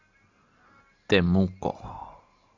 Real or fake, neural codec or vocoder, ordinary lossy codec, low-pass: real; none; AAC, 48 kbps; 7.2 kHz